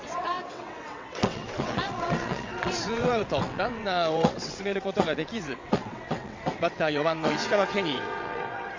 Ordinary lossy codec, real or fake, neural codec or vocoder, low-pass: MP3, 48 kbps; fake; vocoder, 22.05 kHz, 80 mel bands, WaveNeXt; 7.2 kHz